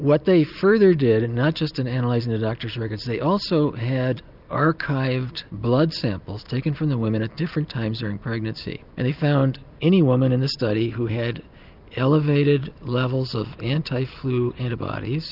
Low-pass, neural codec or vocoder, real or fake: 5.4 kHz; none; real